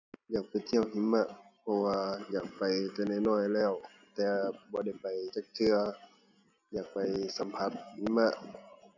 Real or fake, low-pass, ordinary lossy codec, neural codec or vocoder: real; 7.2 kHz; none; none